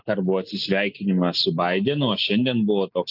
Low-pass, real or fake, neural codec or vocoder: 5.4 kHz; real; none